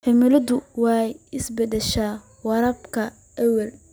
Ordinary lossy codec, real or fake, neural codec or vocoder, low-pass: none; real; none; none